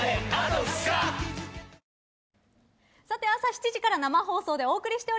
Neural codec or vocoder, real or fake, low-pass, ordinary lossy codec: none; real; none; none